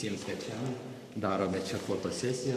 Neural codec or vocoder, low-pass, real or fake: codec, 44.1 kHz, 7.8 kbps, Pupu-Codec; 14.4 kHz; fake